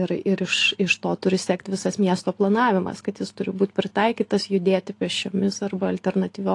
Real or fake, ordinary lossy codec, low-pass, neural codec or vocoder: real; AAC, 48 kbps; 10.8 kHz; none